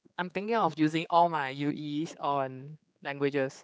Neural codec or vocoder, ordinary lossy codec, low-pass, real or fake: codec, 16 kHz, 4 kbps, X-Codec, HuBERT features, trained on general audio; none; none; fake